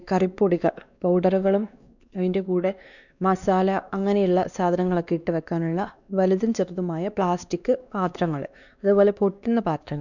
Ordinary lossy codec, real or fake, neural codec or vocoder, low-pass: none; fake; codec, 16 kHz, 2 kbps, X-Codec, WavLM features, trained on Multilingual LibriSpeech; 7.2 kHz